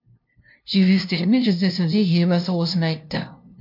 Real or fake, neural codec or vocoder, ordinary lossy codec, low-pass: fake; codec, 16 kHz, 0.5 kbps, FunCodec, trained on LibriTTS, 25 frames a second; MP3, 48 kbps; 5.4 kHz